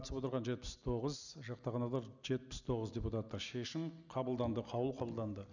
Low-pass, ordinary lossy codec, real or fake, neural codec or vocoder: 7.2 kHz; none; real; none